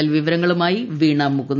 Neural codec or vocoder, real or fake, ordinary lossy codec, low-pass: none; real; none; 7.2 kHz